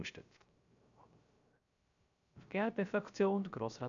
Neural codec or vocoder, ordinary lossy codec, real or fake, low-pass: codec, 16 kHz, 0.3 kbps, FocalCodec; none; fake; 7.2 kHz